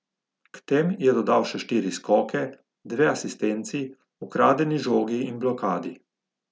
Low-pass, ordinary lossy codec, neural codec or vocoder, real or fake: none; none; none; real